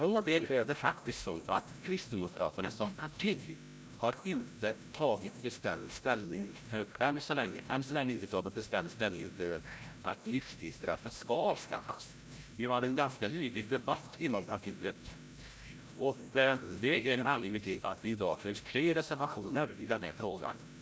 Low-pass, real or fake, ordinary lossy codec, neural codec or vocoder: none; fake; none; codec, 16 kHz, 0.5 kbps, FreqCodec, larger model